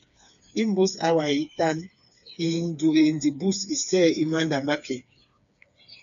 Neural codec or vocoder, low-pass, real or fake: codec, 16 kHz, 4 kbps, FreqCodec, smaller model; 7.2 kHz; fake